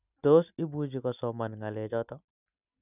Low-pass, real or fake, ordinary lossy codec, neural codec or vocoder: 3.6 kHz; real; none; none